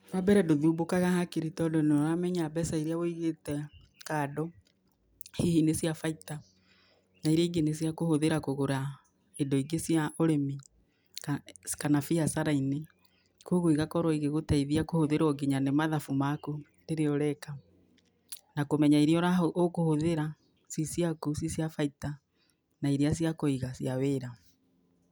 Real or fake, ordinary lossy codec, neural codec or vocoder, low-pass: real; none; none; none